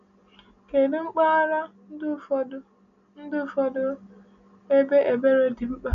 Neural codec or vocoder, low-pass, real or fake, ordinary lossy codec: none; 7.2 kHz; real; none